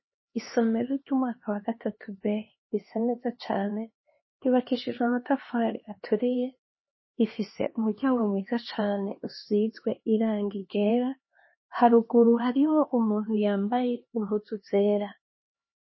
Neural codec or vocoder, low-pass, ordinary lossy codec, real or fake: codec, 16 kHz, 2 kbps, X-Codec, HuBERT features, trained on LibriSpeech; 7.2 kHz; MP3, 24 kbps; fake